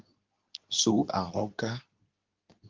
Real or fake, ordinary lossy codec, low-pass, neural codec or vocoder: fake; Opus, 24 kbps; 7.2 kHz; codec, 24 kHz, 0.9 kbps, WavTokenizer, medium speech release version 2